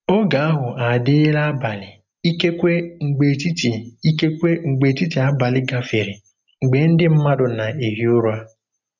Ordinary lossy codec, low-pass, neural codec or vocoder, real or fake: none; 7.2 kHz; none; real